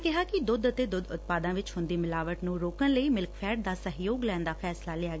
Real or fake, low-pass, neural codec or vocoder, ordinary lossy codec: real; none; none; none